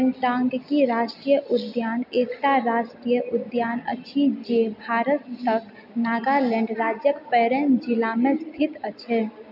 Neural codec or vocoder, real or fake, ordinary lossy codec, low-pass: none; real; none; 5.4 kHz